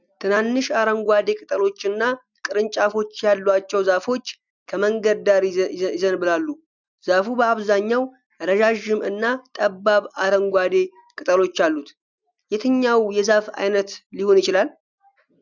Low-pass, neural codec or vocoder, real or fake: 7.2 kHz; none; real